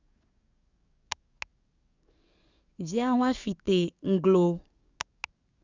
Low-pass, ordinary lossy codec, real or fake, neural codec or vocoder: 7.2 kHz; Opus, 64 kbps; fake; codec, 44.1 kHz, 7.8 kbps, DAC